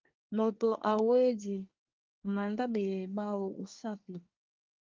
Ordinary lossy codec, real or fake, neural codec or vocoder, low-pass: Opus, 24 kbps; fake; codec, 24 kHz, 1 kbps, SNAC; 7.2 kHz